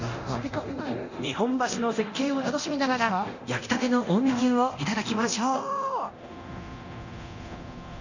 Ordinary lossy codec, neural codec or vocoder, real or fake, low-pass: none; codec, 24 kHz, 0.9 kbps, DualCodec; fake; 7.2 kHz